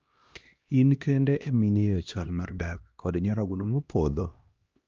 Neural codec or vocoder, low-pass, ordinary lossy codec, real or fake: codec, 16 kHz, 1 kbps, X-Codec, HuBERT features, trained on LibriSpeech; 7.2 kHz; Opus, 24 kbps; fake